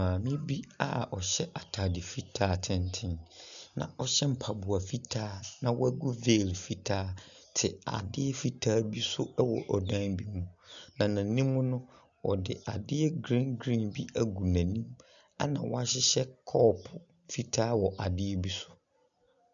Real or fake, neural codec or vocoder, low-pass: real; none; 7.2 kHz